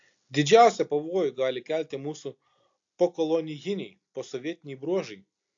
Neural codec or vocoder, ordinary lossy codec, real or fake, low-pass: none; AAC, 48 kbps; real; 7.2 kHz